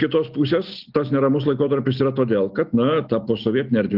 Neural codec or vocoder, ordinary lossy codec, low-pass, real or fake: none; Opus, 32 kbps; 5.4 kHz; real